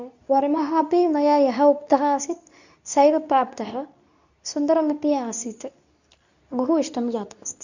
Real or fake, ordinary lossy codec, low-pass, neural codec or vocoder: fake; none; 7.2 kHz; codec, 24 kHz, 0.9 kbps, WavTokenizer, medium speech release version 2